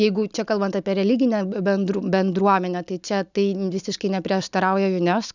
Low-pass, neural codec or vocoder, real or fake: 7.2 kHz; none; real